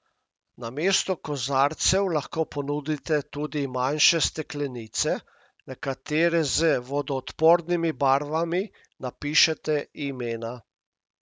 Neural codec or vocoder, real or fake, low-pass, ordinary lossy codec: none; real; none; none